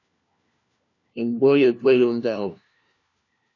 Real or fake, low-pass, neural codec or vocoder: fake; 7.2 kHz; codec, 16 kHz, 1 kbps, FunCodec, trained on LibriTTS, 50 frames a second